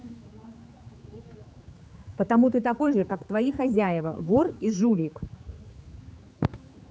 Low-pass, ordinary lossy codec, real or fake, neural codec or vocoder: none; none; fake; codec, 16 kHz, 4 kbps, X-Codec, HuBERT features, trained on general audio